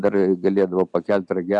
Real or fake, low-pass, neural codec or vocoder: real; 10.8 kHz; none